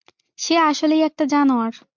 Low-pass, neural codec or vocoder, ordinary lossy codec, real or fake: 7.2 kHz; none; MP3, 64 kbps; real